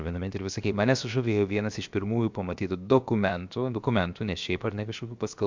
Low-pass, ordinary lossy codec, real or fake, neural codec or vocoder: 7.2 kHz; MP3, 64 kbps; fake; codec, 16 kHz, 0.7 kbps, FocalCodec